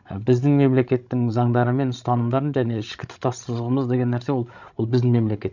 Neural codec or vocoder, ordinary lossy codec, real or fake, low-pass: codec, 16 kHz, 16 kbps, FreqCodec, larger model; none; fake; 7.2 kHz